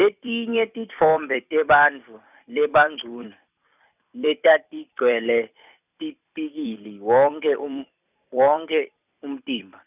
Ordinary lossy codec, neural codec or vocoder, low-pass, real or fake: none; vocoder, 44.1 kHz, 128 mel bands every 256 samples, BigVGAN v2; 3.6 kHz; fake